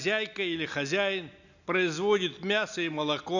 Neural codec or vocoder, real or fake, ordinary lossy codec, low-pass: none; real; none; 7.2 kHz